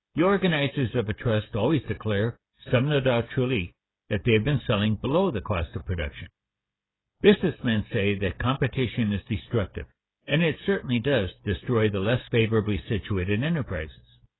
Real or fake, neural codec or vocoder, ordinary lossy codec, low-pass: fake; codec, 16 kHz, 16 kbps, FreqCodec, smaller model; AAC, 16 kbps; 7.2 kHz